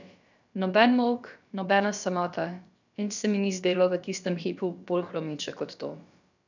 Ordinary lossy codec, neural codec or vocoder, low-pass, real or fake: none; codec, 16 kHz, about 1 kbps, DyCAST, with the encoder's durations; 7.2 kHz; fake